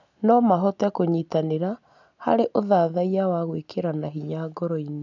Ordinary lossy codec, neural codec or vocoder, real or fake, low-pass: none; none; real; 7.2 kHz